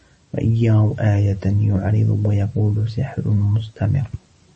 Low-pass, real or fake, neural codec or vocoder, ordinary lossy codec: 10.8 kHz; real; none; MP3, 32 kbps